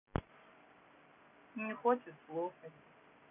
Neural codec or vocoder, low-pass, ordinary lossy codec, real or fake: none; 3.6 kHz; none; real